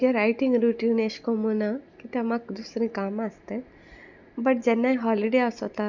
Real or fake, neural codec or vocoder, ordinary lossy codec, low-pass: real; none; none; 7.2 kHz